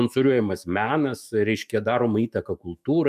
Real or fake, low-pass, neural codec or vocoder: fake; 14.4 kHz; autoencoder, 48 kHz, 128 numbers a frame, DAC-VAE, trained on Japanese speech